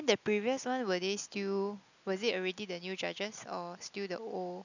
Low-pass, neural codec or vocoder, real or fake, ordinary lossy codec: 7.2 kHz; none; real; none